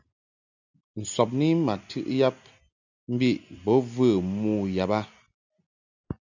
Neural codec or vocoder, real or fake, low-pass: none; real; 7.2 kHz